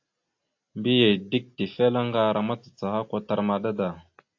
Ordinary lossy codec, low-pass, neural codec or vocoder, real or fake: AAC, 48 kbps; 7.2 kHz; none; real